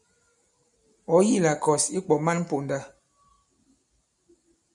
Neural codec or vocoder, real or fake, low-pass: none; real; 10.8 kHz